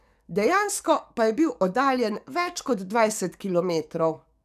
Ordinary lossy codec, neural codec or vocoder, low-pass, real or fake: none; codec, 44.1 kHz, 7.8 kbps, DAC; 14.4 kHz; fake